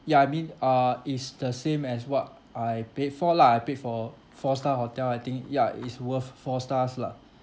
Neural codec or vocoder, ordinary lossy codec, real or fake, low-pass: none; none; real; none